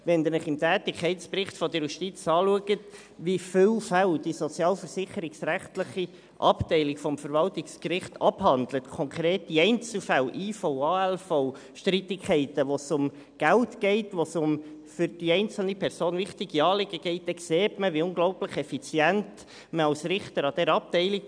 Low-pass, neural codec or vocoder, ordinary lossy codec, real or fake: 9.9 kHz; none; none; real